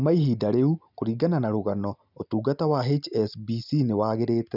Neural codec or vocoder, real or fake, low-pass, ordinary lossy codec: none; real; 5.4 kHz; none